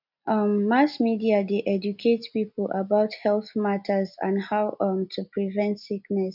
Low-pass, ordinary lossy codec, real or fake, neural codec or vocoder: 5.4 kHz; none; real; none